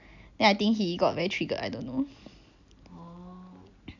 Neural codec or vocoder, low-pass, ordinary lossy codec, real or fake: none; 7.2 kHz; none; real